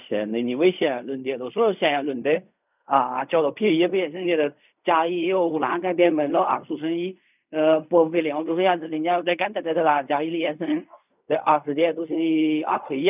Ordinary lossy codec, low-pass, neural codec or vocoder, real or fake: none; 3.6 kHz; codec, 16 kHz in and 24 kHz out, 0.4 kbps, LongCat-Audio-Codec, fine tuned four codebook decoder; fake